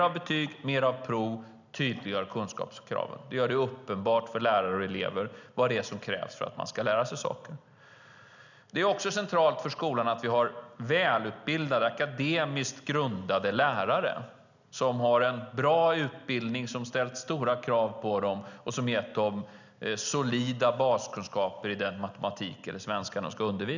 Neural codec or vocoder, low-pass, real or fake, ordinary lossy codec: none; 7.2 kHz; real; none